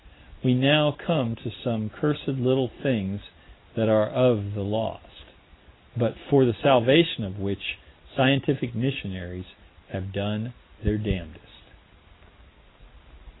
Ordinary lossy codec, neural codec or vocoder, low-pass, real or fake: AAC, 16 kbps; none; 7.2 kHz; real